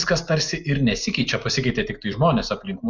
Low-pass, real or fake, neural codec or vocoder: 7.2 kHz; real; none